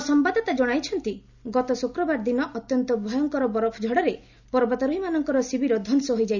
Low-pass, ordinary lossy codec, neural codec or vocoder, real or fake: 7.2 kHz; none; none; real